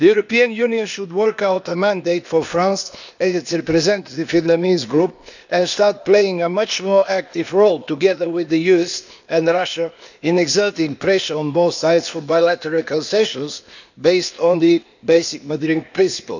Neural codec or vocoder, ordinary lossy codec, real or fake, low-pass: codec, 16 kHz, 0.8 kbps, ZipCodec; none; fake; 7.2 kHz